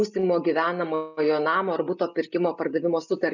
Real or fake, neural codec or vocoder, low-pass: real; none; 7.2 kHz